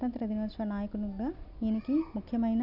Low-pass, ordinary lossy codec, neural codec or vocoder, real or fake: 5.4 kHz; none; none; real